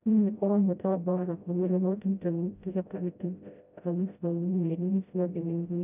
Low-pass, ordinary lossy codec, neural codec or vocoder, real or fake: 3.6 kHz; none; codec, 16 kHz, 0.5 kbps, FreqCodec, smaller model; fake